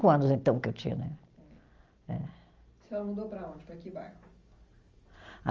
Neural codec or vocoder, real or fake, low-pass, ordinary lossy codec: none; real; 7.2 kHz; Opus, 32 kbps